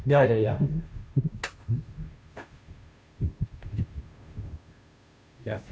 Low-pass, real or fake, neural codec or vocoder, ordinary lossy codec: none; fake; codec, 16 kHz, 0.5 kbps, FunCodec, trained on Chinese and English, 25 frames a second; none